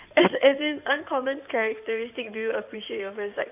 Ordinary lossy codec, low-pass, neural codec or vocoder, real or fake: none; 3.6 kHz; codec, 16 kHz in and 24 kHz out, 2.2 kbps, FireRedTTS-2 codec; fake